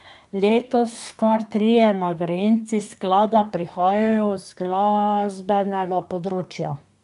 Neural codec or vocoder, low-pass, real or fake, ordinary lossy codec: codec, 24 kHz, 1 kbps, SNAC; 10.8 kHz; fake; AAC, 96 kbps